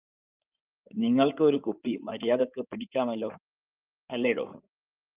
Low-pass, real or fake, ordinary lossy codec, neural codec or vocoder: 3.6 kHz; fake; Opus, 24 kbps; codec, 16 kHz in and 24 kHz out, 2.2 kbps, FireRedTTS-2 codec